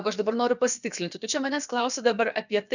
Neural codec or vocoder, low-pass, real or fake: codec, 16 kHz, about 1 kbps, DyCAST, with the encoder's durations; 7.2 kHz; fake